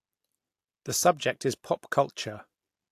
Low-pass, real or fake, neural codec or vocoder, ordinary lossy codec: 14.4 kHz; real; none; AAC, 64 kbps